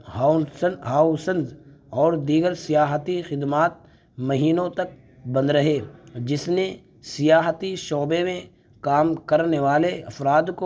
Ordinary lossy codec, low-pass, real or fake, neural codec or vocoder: none; none; real; none